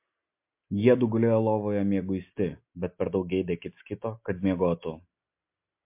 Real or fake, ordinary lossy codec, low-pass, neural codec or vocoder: real; MP3, 32 kbps; 3.6 kHz; none